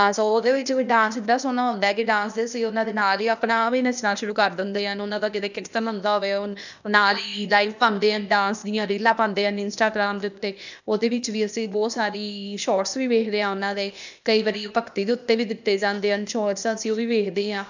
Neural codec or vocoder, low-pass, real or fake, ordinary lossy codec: codec, 16 kHz, 0.8 kbps, ZipCodec; 7.2 kHz; fake; none